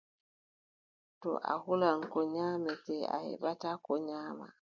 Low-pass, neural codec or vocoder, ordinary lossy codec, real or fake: 7.2 kHz; none; MP3, 64 kbps; real